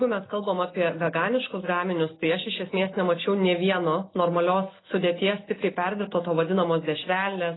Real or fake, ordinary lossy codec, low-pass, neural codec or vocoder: real; AAC, 16 kbps; 7.2 kHz; none